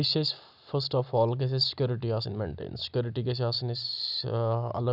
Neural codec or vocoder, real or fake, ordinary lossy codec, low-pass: none; real; none; 5.4 kHz